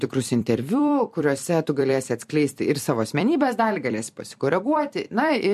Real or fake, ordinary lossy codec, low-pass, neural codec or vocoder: fake; MP3, 64 kbps; 14.4 kHz; vocoder, 44.1 kHz, 128 mel bands every 256 samples, BigVGAN v2